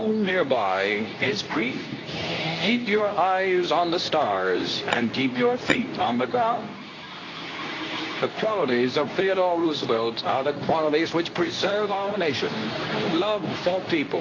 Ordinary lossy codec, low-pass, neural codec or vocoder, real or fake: AAC, 32 kbps; 7.2 kHz; codec, 24 kHz, 0.9 kbps, WavTokenizer, medium speech release version 2; fake